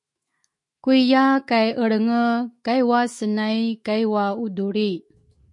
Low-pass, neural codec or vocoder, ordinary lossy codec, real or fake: 10.8 kHz; autoencoder, 48 kHz, 128 numbers a frame, DAC-VAE, trained on Japanese speech; MP3, 48 kbps; fake